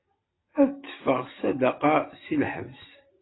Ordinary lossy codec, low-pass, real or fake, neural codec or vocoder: AAC, 16 kbps; 7.2 kHz; real; none